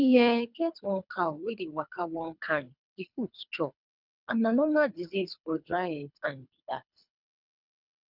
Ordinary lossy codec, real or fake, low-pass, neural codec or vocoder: none; fake; 5.4 kHz; codec, 24 kHz, 3 kbps, HILCodec